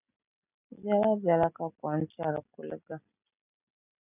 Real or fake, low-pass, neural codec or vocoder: real; 3.6 kHz; none